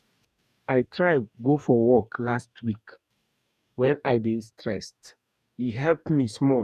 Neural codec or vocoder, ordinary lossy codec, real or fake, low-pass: codec, 44.1 kHz, 2.6 kbps, DAC; none; fake; 14.4 kHz